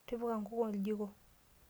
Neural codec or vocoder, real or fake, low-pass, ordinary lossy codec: none; real; none; none